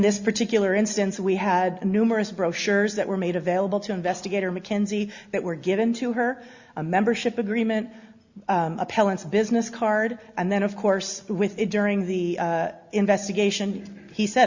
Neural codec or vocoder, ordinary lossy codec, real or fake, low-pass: none; Opus, 64 kbps; real; 7.2 kHz